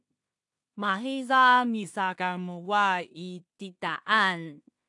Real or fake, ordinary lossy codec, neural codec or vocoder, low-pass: fake; AAC, 64 kbps; codec, 16 kHz in and 24 kHz out, 0.4 kbps, LongCat-Audio-Codec, two codebook decoder; 10.8 kHz